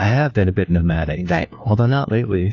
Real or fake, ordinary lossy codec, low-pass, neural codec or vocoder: fake; AAC, 48 kbps; 7.2 kHz; codec, 16 kHz, 1 kbps, FunCodec, trained on LibriTTS, 50 frames a second